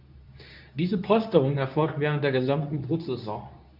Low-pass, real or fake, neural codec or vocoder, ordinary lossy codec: 5.4 kHz; fake; codec, 24 kHz, 0.9 kbps, WavTokenizer, medium speech release version 2; none